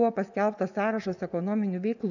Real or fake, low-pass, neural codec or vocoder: fake; 7.2 kHz; vocoder, 24 kHz, 100 mel bands, Vocos